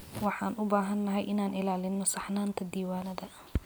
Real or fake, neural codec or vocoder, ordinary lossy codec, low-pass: real; none; none; none